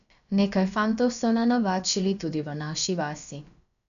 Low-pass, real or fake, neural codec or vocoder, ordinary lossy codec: 7.2 kHz; fake; codec, 16 kHz, about 1 kbps, DyCAST, with the encoder's durations; none